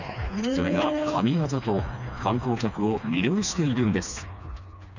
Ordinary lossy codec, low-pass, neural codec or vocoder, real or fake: none; 7.2 kHz; codec, 16 kHz, 2 kbps, FreqCodec, smaller model; fake